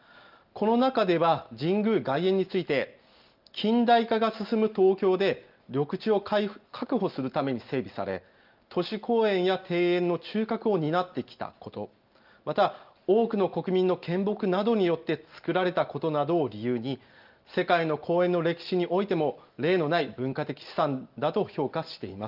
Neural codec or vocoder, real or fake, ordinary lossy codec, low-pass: none; real; Opus, 24 kbps; 5.4 kHz